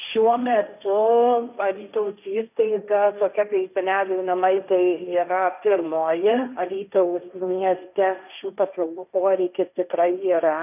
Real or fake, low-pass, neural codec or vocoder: fake; 3.6 kHz; codec, 16 kHz, 1.1 kbps, Voila-Tokenizer